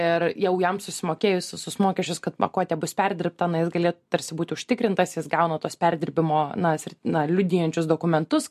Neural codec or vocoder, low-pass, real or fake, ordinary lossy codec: none; 14.4 kHz; real; MP3, 64 kbps